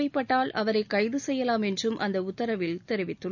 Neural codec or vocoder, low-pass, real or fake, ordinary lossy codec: none; 7.2 kHz; real; none